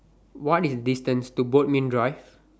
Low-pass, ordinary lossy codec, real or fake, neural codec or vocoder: none; none; real; none